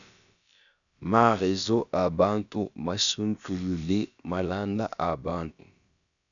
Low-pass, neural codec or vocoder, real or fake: 7.2 kHz; codec, 16 kHz, about 1 kbps, DyCAST, with the encoder's durations; fake